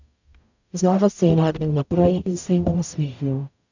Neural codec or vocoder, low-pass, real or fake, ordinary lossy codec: codec, 44.1 kHz, 0.9 kbps, DAC; 7.2 kHz; fake; none